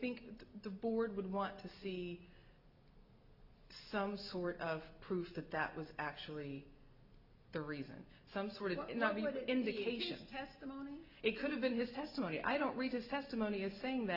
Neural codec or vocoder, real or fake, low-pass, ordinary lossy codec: none; real; 5.4 kHz; Opus, 64 kbps